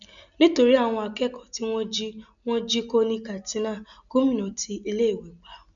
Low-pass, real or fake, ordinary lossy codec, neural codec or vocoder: 7.2 kHz; real; none; none